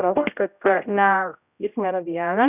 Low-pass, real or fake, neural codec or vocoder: 3.6 kHz; fake; codec, 16 kHz, 0.5 kbps, X-Codec, HuBERT features, trained on general audio